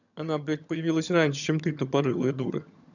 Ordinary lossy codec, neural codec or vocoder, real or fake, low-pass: none; vocoder, 22.05 kHz, 80 mel bands, HiFi-GAN; fake; 7.2 kHz